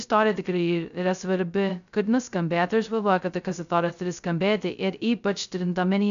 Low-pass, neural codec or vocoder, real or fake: 7.2 kHz; codec, 16 kHz, 0.2 kbps, FocalCodec; fake